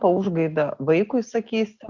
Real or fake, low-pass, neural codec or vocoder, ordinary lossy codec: real; 7.2 kHz; none; Opus, 64 kbps